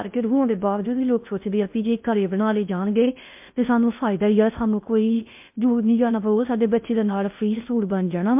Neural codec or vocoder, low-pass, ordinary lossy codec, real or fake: codec, 16 kHz in and 24 kHz out, 0.6 kbps, FocalCodec, streaming, 4096 codes; 3.6 kHz; MP3, 32 kbps; fake